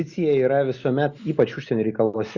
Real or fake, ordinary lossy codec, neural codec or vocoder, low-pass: real; Opus, 64 kbps; none; 7.2 kHz